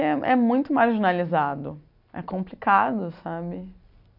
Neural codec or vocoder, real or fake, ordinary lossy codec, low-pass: none; real; none; 5.4 kHz